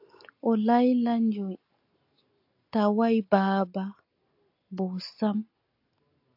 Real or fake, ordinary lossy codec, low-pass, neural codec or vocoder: real; AAC, 48 kbps; 5.4 kHz; none